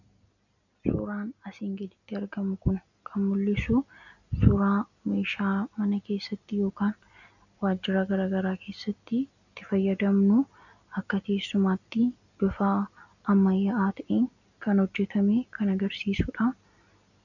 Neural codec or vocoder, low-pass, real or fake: none; 7.2 kHz; real